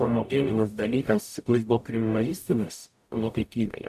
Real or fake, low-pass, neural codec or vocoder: fake; 14.4 kHz; codec, 44.1 kHz, 0.9 kbps, DAC